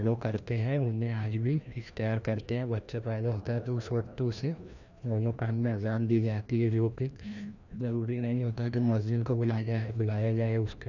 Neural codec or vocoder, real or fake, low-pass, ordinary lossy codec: codec, 16 kHz, 1 kbps, FreqCodec, larger model; fake; 7.2 kHz; none